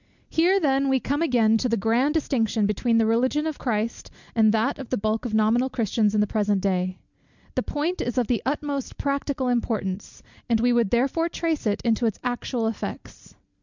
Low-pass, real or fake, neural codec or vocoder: 7.2 kHz; real; none